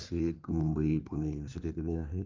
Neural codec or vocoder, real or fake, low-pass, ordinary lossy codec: codec, 16 kHz in and 24 kHz out, 1.1 kbps, FireRedTTS-2 codec; fake; 7.2 kHz; Opus, 24 kbps